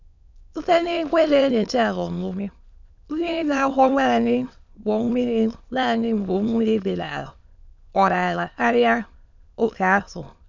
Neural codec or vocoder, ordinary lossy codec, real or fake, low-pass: autoencoder, 22.05 kHz, a latent of 192 numbers a frame, VITS, trained on many speakers; none; fake; 7.2 kHz